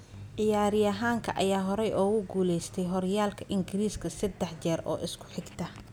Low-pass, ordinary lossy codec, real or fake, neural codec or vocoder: none; none; real; none